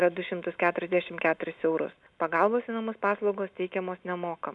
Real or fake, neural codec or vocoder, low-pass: real; none; 10.8 kHz